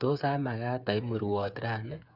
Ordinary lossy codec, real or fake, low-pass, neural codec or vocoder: none; fake; 5.4 kHz; codec, 16 kHz, 8 kbps, FreqCodec, smaller model